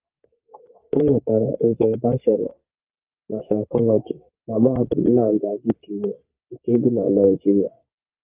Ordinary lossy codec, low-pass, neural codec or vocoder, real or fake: Opus, 24 kbps; 3.6 kHz; codec, 44.1 kHz, 3.4 kbps, Pupu-Codec; fake